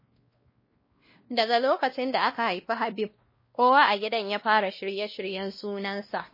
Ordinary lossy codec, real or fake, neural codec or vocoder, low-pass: MP3, 24 kbps; fake; codec, 16 kHz, 1 kbps, X-Codec, WavLM features, trained on Multilingual LibriSpeech; 5.4 kHz